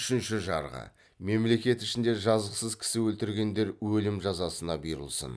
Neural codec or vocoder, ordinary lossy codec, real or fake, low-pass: none; none; real; none